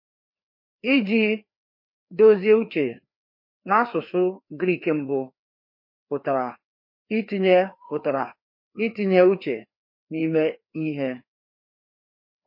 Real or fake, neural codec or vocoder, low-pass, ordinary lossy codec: fake; codec, 16 kHz, 2 kbps, FreqCodec, larger model; 5.4 kHz; MP3, 32 kbps